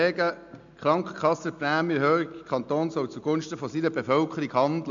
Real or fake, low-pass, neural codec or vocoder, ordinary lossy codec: real; 7.2 kHz; none; MP3, 64 kbps